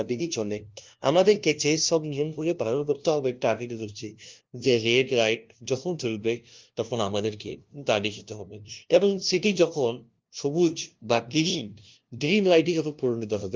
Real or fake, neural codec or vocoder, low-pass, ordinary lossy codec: fake; codec, 16 kHz, 0.5 kbps, FunCodec, trained on LibriTTS, 25 frames a second; 7.2 kHz; Opus, 32 kbps